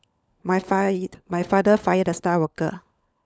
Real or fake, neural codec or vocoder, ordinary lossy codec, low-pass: fake; codec, 16 kHz, 16 kbps, FunCodec, trained on LibriTTS, 50 frames a second; none; none